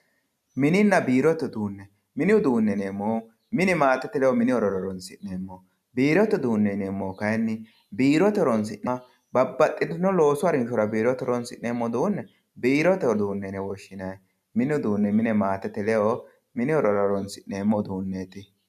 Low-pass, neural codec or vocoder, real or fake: 14.4 kHz; none; real